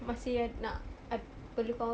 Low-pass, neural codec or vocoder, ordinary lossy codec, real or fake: none; none; none; real